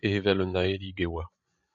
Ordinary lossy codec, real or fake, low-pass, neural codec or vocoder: AAC, 64 kbps; fake; 7.2 kHz; codec, 16 kHz, 16 kbps, FreqCodec, larger model